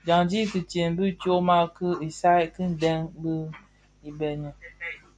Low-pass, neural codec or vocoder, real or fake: 9.9 kHz; none; real